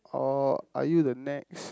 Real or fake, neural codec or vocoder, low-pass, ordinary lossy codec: real; none; none; none